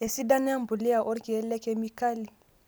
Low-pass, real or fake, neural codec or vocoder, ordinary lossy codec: none; real; none; none